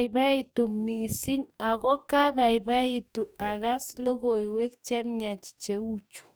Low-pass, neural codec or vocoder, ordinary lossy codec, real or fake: none; codec, 44.1 kHz, 2.6 kbps, DAC; none; fake